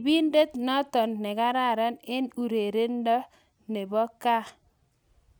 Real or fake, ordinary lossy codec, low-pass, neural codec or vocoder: real; none; none; none